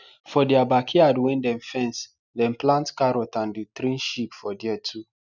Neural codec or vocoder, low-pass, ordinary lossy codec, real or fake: none; 7.2 kHz; none; real